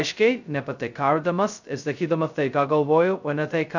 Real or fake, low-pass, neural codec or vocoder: fake; 7.2 kHz; codec, 16 kHz, 0.2 kbps, FocalCodec